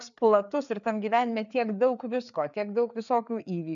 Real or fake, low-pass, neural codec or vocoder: fake; 7.2 kHz; codec, 16 kHz, 4 kbps, FreqCodec, larger model